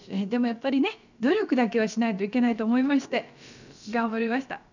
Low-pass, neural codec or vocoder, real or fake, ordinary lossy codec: 7.2 kHz; codec, 16 kHz, about 1 kbps, DyCAST, with the encoder's durations; fake; none